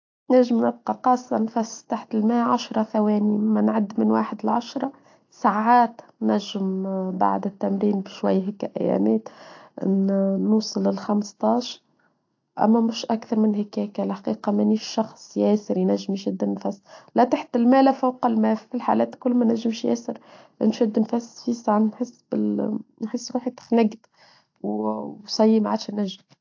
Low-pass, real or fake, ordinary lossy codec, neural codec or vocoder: 7.2 kHz; real; AAC, 48 kbps; none